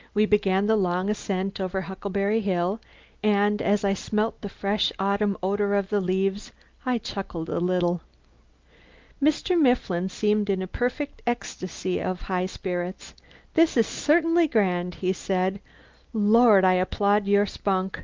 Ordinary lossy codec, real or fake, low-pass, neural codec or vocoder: Opus, 32 kbps; real; 7.2 kHz; none